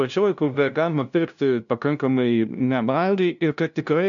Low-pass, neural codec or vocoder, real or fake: 7.2 kHz; codec, 16 kHz, 0.5 kbps, FunCodec, trained on LibriTTS, 25 frames a second; fake